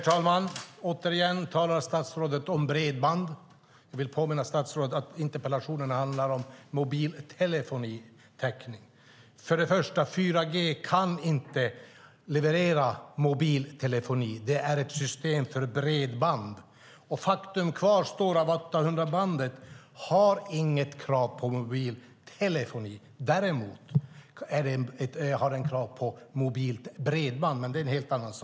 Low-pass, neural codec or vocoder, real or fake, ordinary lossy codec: none; none; real; none